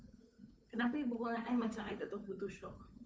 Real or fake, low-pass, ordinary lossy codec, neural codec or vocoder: fake; none; none; codec, 16 kHz, 8 kbps, FunCodec, trained on Chinese and English, 25 frames a second